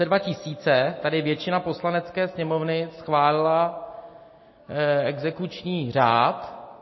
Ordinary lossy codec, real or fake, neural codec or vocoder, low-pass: MP3, 24 kbps; real; none; 7.2 kHz